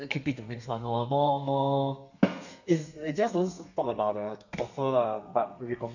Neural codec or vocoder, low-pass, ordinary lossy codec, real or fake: codec, 32 kHz, 1.9 kbps, SNAC; 7.2 kHz; none; fake